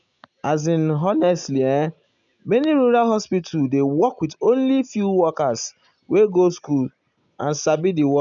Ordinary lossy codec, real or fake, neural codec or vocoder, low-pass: none; real; none; 7.2 kHz